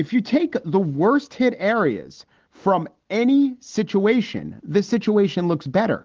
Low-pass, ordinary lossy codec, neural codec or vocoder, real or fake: 7.2 kHz; Opus, 16 kbps; none; real